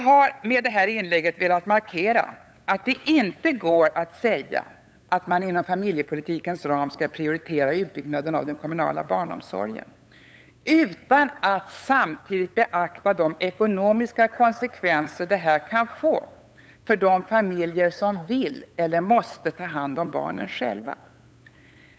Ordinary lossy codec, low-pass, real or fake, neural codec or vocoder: none; none; fake; codec, 16 kHz, 8 kbps, FunCodec, trained on LibriTTS, 25 frames a second